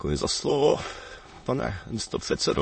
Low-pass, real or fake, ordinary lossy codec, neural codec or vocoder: 9.9 kHz; fake; MP3, 32 kbps; autoencoder, 22.05 kHz, a latent of 192 numbers a frame, VITS, trained on many speakers